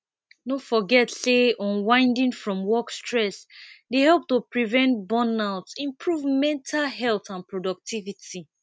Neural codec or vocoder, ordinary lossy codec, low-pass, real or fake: none; none; none; real